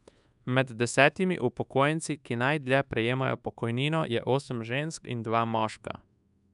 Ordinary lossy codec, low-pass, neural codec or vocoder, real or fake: none; 10.8 kHz; codec, 24 kHz, 1.2 kbps, DualCodec; fake